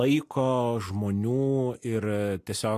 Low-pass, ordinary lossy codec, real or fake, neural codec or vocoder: 14.4 kHz; AAC, 64 kbps; real; none